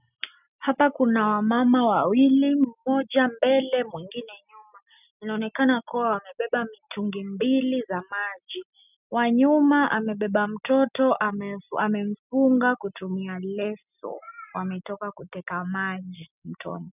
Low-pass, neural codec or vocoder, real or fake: 3.6 kHz; none; real